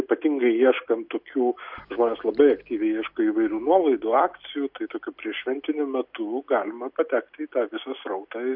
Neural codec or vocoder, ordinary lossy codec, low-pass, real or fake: none; MP3, 48 kbps; 5.4 kHz; real